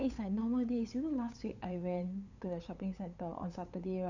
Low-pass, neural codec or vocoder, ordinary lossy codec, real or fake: 7.2 kHz; codec, 16 kHz, 8 kbps, FunCodec, trained on LibriTTS, 25 frames a second; none; fake